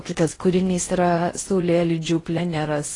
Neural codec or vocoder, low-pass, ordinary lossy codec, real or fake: codec, 16 kHz in and 24 kHz out, 0.6 kbps, FocalCodec, streaming, 4096 codes; 10.8 kHz; AAC, 32 kbps; fake